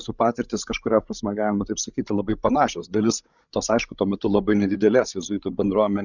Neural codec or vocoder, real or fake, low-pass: codec, 16 kHz, 16 kbps, FreqCodec, larger model; fake; 7.2 kHz